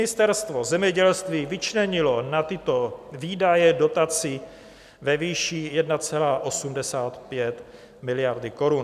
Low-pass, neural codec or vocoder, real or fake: 14.4 kHz; none; real